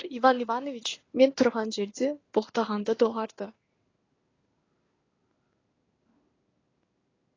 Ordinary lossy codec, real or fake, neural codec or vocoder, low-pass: AAC, 32 kbps; fake; codec, 16 kHz in and 24 kHz out, 1 kbps, XY-Tokenizer; 7.2 kHz